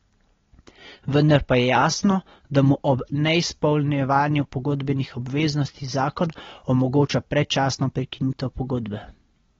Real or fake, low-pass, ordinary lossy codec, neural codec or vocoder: real; 7.2 kHz; AAC, 24 kbps; none